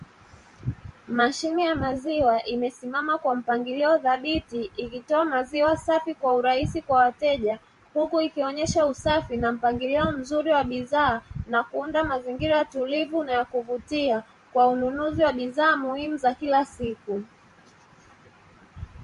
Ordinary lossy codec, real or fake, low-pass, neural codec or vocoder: MP3, 48 kbps; fake; 14.4 kHz; vocoder, 44.1 kHz, 128 mel bands every 512 samples, BigVGAN v2